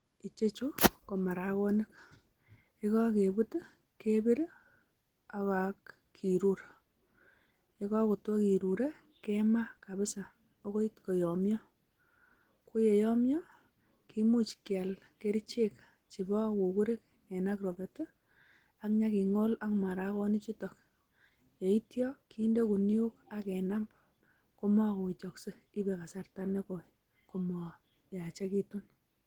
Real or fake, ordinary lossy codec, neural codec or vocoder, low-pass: real; Opus, 16 kbps; none; 19.8 kHz